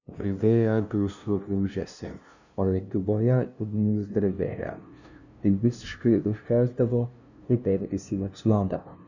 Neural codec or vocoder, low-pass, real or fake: codec, 16 kHz, 0.5 kbps, FunCodec, trained on LibriTTS, 25 frames a second; 7.2 kHz; fake